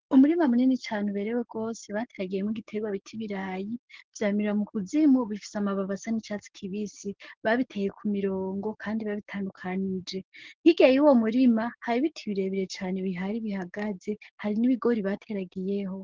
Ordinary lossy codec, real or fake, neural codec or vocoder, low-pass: Opus, 16 kbps; fake; codec, 44.1 kHz, 7.8 kbps, DAC; 7.2 kHz